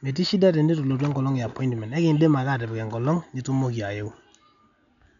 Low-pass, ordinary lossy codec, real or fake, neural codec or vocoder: 7.2 kHz; none; real; none